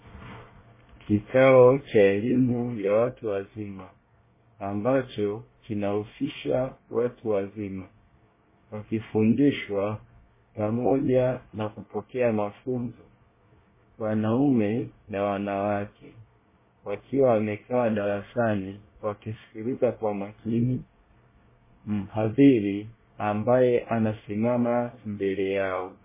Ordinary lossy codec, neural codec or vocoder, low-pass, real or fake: MP3, 16 kbps; codec, 24 kHz, 1 kbps, SNAC; 3.6 kHz; fake